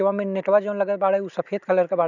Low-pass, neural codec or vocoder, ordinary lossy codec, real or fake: 7.2 kHz; none; none; real